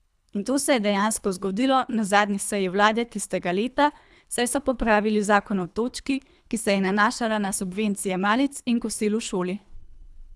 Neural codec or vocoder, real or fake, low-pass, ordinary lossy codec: codec, 24 kHz, 3 kbps, HILCodec; fake; none; none